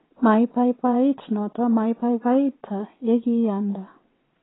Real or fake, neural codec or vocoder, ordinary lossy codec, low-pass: fake; vocoder, 22.05 kHz, 80 mel bands, WaveNeXt; AAC, 16 kbps; 7.2 kHz